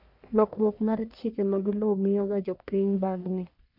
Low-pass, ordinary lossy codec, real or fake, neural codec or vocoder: 5.4 kHz; none; fake; codec, 44.1 kHz, 1.7 kbps, Pupu-Codec